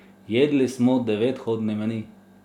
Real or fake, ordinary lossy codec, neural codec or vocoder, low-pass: real; none; none; 19.8 kHz